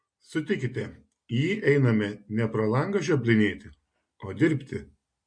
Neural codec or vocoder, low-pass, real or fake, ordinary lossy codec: none; 9.9 kHz; real; MP3, 48 kbps